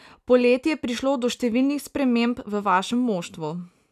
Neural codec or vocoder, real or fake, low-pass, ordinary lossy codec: none; real; 14.4 kHz; none